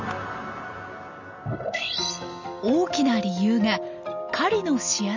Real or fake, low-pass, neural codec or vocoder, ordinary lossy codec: real; 7.2 kHz; none; none